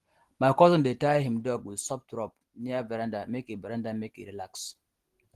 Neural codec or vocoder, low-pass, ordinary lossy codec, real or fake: none; 14.4 kHz; Opus, 16 kbps; real